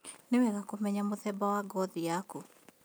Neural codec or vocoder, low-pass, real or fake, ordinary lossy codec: none; none; real; none